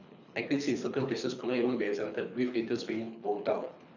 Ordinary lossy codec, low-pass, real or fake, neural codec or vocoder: none; 7.2 kHz; fake; codec, 24 kHz, 3 kbps, HILCodec